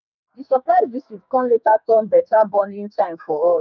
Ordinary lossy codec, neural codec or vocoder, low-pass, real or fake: none; codec, 44.1 kHz, 2.6 kbps, SNAC; 7.2 kHz; fake